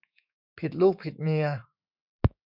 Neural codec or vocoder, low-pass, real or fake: autoencoder, 48 kHz, 128 numbers a frame, DAC-VAE, trained on Japanese speech; 5.4 kHz; fake